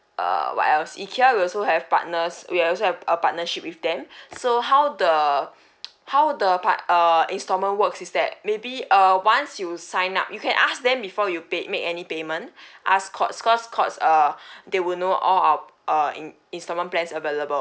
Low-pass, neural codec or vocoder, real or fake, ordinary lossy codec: none; none; real; none